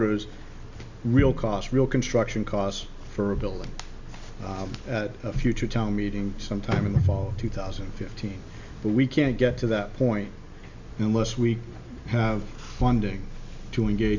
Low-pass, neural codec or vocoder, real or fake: 7.2 kHz; none; real